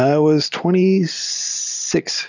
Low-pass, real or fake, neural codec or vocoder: 7.2 kHz; fake; vocoder, 44.1 kHz, 128 mel bands every 512 samples, BigVGAN v2